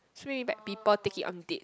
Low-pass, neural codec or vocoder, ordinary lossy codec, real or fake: none; none; none; real